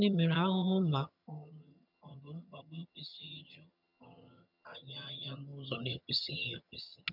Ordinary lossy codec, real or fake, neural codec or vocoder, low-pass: none; fake; vocoder, 22.05 kHz, 80 mel bands, HiFi-GAN; 5.4 kHz